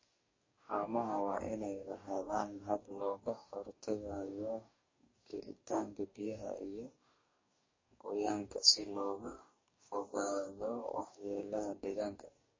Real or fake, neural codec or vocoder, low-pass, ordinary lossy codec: fake; codec, 44.1 kHz, 2.6 kbps, DAC; 7.2 kHz; MP3, 32 kbps